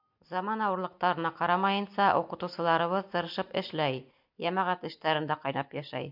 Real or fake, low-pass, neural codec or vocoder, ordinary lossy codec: real; 5.4 kHz; none; MP3, 48 kbps